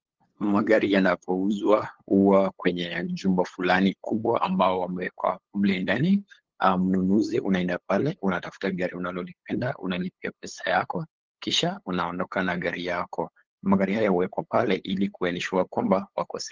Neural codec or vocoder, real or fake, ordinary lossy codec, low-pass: codec, 16 kHz, 8 kbps, FunCodec, trained on LibriTTS, 25 frames a second; fake; Opus, 16 kbps; 7.2 kHz